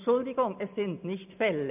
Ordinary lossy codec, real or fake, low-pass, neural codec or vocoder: none; real; 3.6 kHz; none